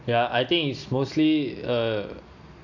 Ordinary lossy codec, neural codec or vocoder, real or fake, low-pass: none; none; real; 7.2 kHz